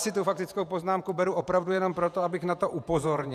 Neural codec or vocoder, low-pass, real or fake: vocoder, 48 kHz, 128 mel bands, Vocos; 14.4 kHz; fake